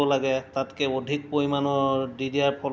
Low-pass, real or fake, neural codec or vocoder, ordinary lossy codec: none; real; none; none